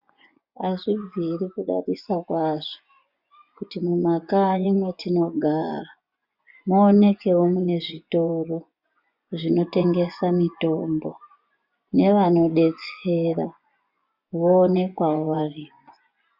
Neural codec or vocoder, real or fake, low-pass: vocoder, 24 kHz, 100 mel bands, Vocos; fake; 5.4 kHz